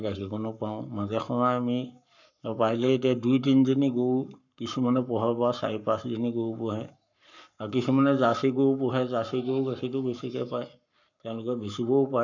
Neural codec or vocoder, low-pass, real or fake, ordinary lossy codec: codec, 44.1 kHz, 7.8 kbps, Pupu-Codec; 7.2 kHz; fake; none